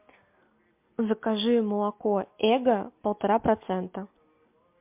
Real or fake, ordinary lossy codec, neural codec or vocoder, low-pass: real; MP3, 32 kbps; none; 3.6 kHz